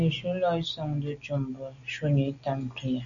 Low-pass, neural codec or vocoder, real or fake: 7.2 kHz; none; real